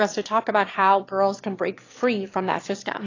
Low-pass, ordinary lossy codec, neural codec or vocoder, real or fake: 7.2 kHz; AAC, 32 kbps; autoencoder, 22.05 kHz, a latent of 192 numbers a frame, VITS, trained on one speaker; fake